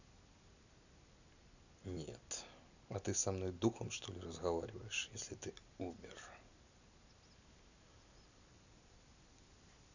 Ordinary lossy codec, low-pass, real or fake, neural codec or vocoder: none; 7.2 kHz; real; none